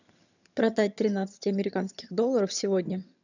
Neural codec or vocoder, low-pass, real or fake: vocoder, 22.05 kHz, 80 mel bands, HiFi-GAN; 7.2 kHz; fake